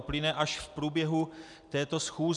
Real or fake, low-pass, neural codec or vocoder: real; 10.8 kHz; none